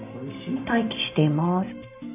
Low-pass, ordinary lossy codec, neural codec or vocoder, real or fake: 3.6 kHz; none; none; real